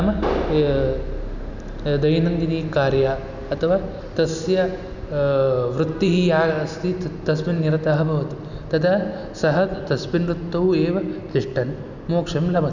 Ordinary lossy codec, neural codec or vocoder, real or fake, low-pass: none; none; real; 7.2 kHz